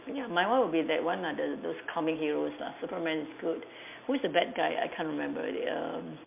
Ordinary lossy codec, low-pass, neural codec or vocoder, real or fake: MP3, 32 kbps; 3.6 kHz; none; real